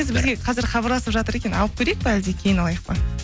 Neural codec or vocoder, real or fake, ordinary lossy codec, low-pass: none; real; none; none